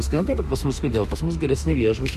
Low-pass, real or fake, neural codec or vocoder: 14.4 kHz; fake; autoencoder, 48 kHz, 32 numbers a frame, DAC-VAE, trained on Japanese speech